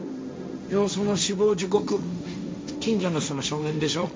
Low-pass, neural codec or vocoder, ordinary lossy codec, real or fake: none; codec, 16 kHz, 1.1 kbps, Voila-Tokenizer; none; fake